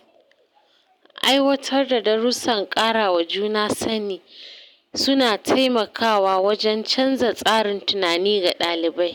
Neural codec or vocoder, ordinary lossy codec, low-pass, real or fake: none; none; 19.8 kHz; real